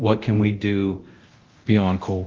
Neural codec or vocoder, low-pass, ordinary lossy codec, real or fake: codec, 24 kHz, 0.9 kbps, DualCodec; 7.2 kHz; Opus, 16 kbps; fake